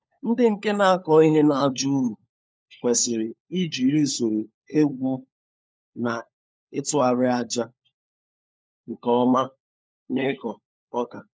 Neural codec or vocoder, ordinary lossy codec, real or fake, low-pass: codec, 16 kHz, 4 kbps, FunCodec, trained on LibriTTS, 50 frames a second; none; fake; none